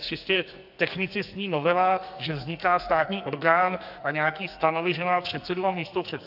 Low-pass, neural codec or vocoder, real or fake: 5.4 kHz; codec, 44.1 kHz, 2.6 kbps, SNAC; fake